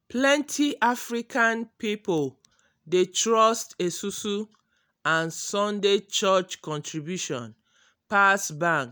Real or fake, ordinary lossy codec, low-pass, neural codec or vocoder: real; none; none; none